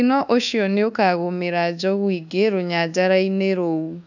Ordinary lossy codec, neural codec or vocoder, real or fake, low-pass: none; codec, 24 kHz, 1.2 kbps, DualCodec; fake; 7.2 kHz